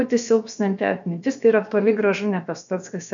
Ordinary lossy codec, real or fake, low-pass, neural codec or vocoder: MP3, 96 kbps; fake; 7.2 kHz; codec, 16 kHz, about 1 kbps, DyCAST, with the encoder's durations